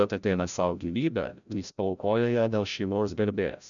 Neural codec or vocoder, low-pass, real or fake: codec, 16 kHz, 0.5 kbps, FreqCodec, larger model; 7.2 kHz; fake